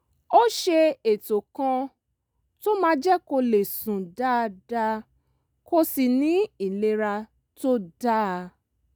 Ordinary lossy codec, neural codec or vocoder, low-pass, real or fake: none; none; none; real